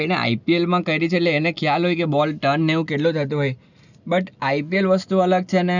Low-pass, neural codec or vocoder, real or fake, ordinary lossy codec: 7.2 kHz; none; real; none